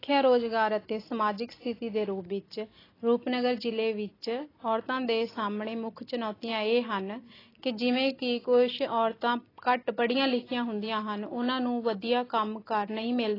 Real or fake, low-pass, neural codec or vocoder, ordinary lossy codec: real; 5.4 kHz; none; AAC, 24 kbps